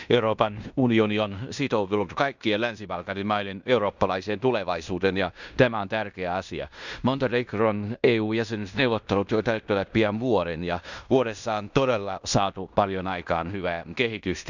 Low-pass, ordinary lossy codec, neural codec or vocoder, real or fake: 7.2 kHz; none; codec, 16 kHz in and 24 kHz out, 0.9 kbps, LongCat-Audio-Codec, fine tuned four codebook decoder; fake